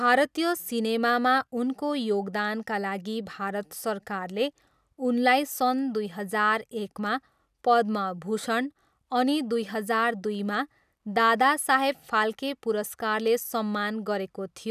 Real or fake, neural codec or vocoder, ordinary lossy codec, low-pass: real; none; none; 14.4 kHz